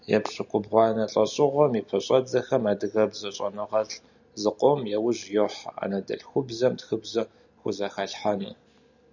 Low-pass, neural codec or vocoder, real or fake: 7.2 kHz; none; real